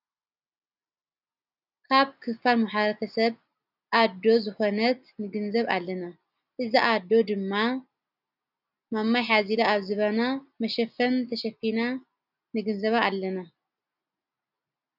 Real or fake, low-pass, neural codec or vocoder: real; 5.4 kHz; none